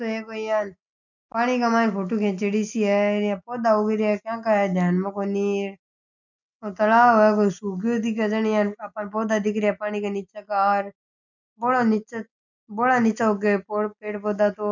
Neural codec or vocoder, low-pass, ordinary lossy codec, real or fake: none; 7.2 kHz; none; real